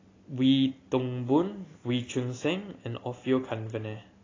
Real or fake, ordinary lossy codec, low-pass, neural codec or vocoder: real; AAC, 32 kbps; 7.2 kHz; none